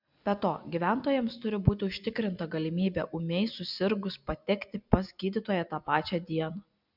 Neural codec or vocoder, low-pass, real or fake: none; 5.4 kHz; real